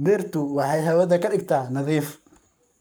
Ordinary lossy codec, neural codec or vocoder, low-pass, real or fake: none; codec, 44.1 kHz, 7.8 kbps, Pupu-Codec; none; fake